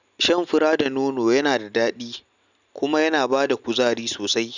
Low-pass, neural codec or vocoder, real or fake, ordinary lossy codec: 7.2 kHz; none; real; none